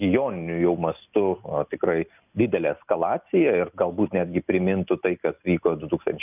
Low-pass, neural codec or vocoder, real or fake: 3.6 kHz; none; real